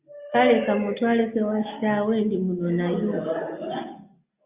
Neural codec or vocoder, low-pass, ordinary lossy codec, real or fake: none; 3.6 kHz; Opus, 64 kbps; real